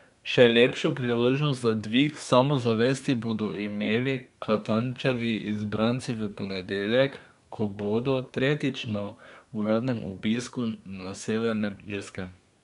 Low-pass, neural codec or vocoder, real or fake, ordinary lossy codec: 10.8 kHz; codec, 24 kHz, 1 kbps, SNAC; fake; none